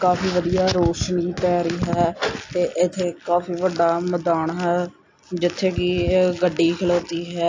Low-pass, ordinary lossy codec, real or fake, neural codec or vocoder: 7.2 kHz; AAC, 48 kbps; real; none